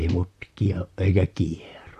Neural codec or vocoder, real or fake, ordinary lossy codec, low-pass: vocoder, 44.1 kHz, 128 mel bands, Pupu-Vocoder; fake; none; 14.4 kHz